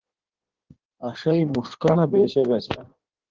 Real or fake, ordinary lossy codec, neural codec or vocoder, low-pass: fake; Opus, 16 kbps; codec, 16 kHz in and 24 kHz out, 1.1 kbps, FireRedTTS-2 codec; 7.2 kHz